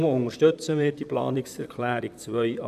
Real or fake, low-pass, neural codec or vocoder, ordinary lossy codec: fake; 14.4 kHz; vocoder, 44.1 kHz, 128 mel bands, Pupu-Vocoder; none